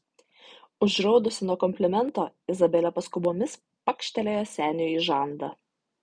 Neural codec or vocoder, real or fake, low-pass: none; real; 9.9 kHz